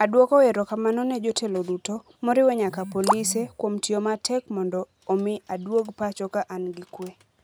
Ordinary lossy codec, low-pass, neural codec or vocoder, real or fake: none; none; none; real